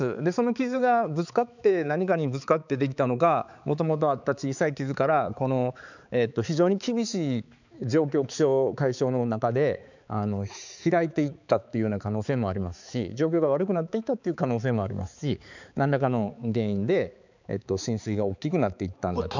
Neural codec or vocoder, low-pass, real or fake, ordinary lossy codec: codec, 16 kHz, 4 kbps, X-Codec, HuBERT features, trained on balanced general audio; 7.2 kHz; fake; none